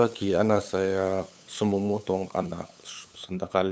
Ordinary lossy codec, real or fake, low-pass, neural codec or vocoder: none; fake; none; codec, 16 kHz, 8 kbps, FunCodec, trained on LibriTTS, 25 frames a second